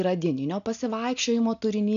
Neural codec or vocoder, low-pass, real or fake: none; 7.2 kHz; real